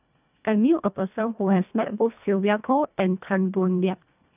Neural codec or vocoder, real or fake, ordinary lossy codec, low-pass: codec, 24 kHz, 1.5 kbps, HILCodec; fake; none; 3.6 kHz